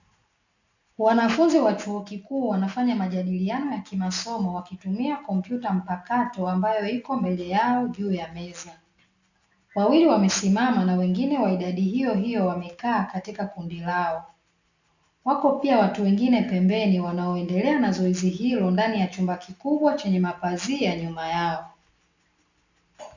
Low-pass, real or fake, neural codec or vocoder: 7.2 kHz; real; none